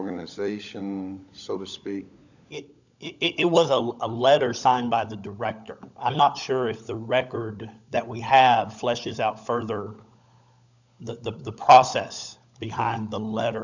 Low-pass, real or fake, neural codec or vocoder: 7.2 kHz; fake; codec, 16 kHz, 16 kbps, FunCodec, trained on LibriTTS, 50 frames a second